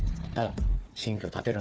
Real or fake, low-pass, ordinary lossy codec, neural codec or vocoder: fake; none; none; codec, 16 kHz, 4 kbps, FunCodec, trained on Chinese and English, 50 frames a second